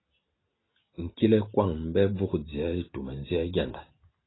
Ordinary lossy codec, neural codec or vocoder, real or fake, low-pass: AAC, 16 kbps; none; real; 7.2 kHz